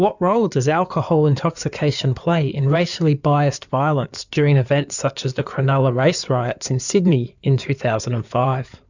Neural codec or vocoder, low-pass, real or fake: codec, 16 kHz in and 24 kHz out, 2.2 kbps, FireRedTTS-2 codec; 7.2 kHz; fake